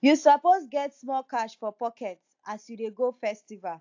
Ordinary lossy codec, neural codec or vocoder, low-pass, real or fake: AAC, 48 kbps; none; 7.2 kHz; real